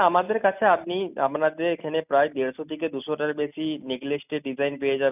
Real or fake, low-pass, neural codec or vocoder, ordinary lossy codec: real; 3.6 kHz; none; none